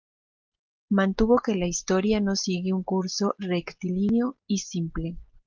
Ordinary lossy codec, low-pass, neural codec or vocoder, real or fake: Opus, 32 kbps; 7.2 kHz; none; real